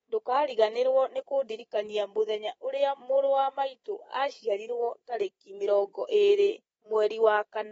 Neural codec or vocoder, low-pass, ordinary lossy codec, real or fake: codec, 24 kHz, 3.1 kbps, DualCodec; 10.8 kHz; AAC, 24 kbps; fake